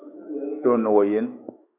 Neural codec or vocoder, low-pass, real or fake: autoencoder, 48 kHz, 128 numbers a frame, DAC-VAE, trained on Japanese speech; 3.6 kHz; fake